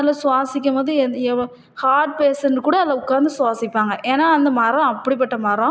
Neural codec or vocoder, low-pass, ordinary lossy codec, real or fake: none; none; none; real